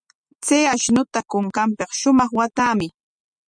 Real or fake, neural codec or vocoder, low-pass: real; none; 9.9 kHz